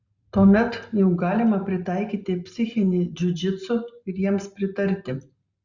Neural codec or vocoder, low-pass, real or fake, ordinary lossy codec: none; 7.2 kHz; real; Opus, 64 kbps